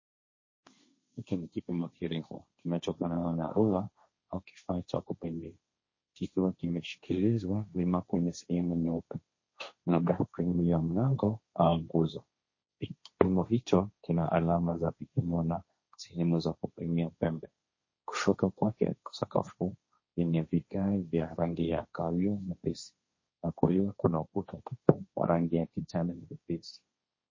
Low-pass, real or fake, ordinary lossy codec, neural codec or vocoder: 7.2 kHz; fake; MP3, 32 kbps; codec, 16 kHz, 1.1 kbps, Voila-Tokenizer